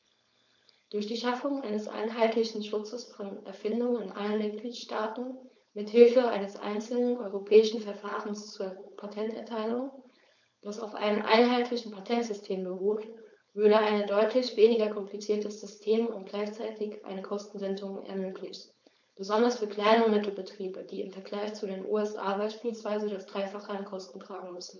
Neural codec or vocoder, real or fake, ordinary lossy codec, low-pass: codec, 16 kHz, 4.8 kbps, FACodec; fake; none; 7.2 kHz